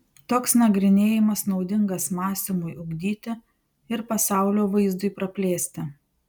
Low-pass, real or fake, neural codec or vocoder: 19.8 kHz; real; none